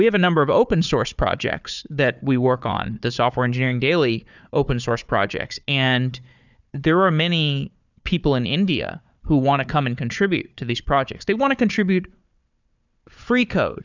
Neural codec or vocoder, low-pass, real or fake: codec, 16 kHz, 4 kbps, FunCodec, trained on Chinese and English, 50 frames a second; 7.2 kHz; fake